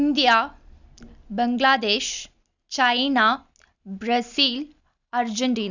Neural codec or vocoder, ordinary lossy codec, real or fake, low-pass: none; none; real; 7.2 kHz